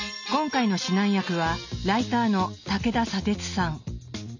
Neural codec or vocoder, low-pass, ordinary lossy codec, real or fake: none; 7.2 kHz; none; real